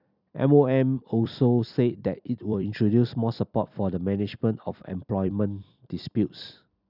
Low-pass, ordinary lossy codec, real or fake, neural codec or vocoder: 5.4 kHz; none; fake; vocoder, 44.1 kHz, 128 mel bands every 256 samples, BigVGAN v2